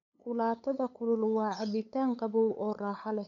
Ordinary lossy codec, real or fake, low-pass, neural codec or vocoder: none; fake; 7.2 kHz; codec, 16 kHz, 8 kbps, FunCodec, trained on LibriTTS, 25 frames a second